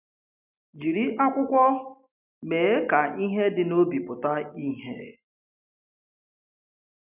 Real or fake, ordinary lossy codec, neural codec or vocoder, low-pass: real; none; none; 3.6 kHz